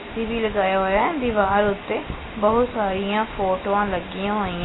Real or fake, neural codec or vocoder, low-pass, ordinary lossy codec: real; none; 7.2 kHz; AAC, 16 kbps